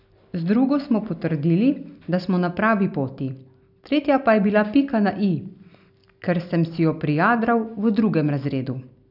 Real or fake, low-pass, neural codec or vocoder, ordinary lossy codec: real; 5.4 kHz; none; none